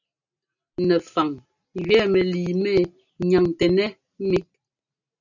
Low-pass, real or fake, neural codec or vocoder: 7.2 kHz; real; none